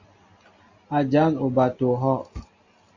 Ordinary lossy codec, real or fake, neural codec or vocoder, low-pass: Opus, 64 kbps; real; none; 7.2 kHz